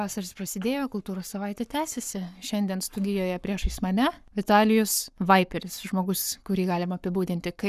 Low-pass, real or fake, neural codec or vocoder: 14.4 kHz; fake; codec, 44.1 kHz, 7.8 kbps, Pupu-Codec